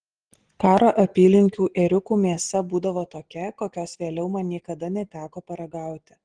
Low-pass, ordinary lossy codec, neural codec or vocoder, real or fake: 9.9 kHz; Opus, 16 kbps; none; real